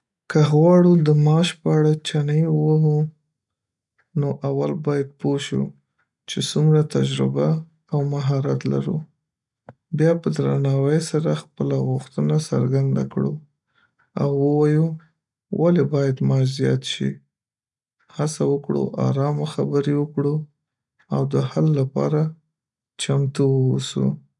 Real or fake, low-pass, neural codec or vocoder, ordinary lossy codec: real; 10.8 kHz; none; none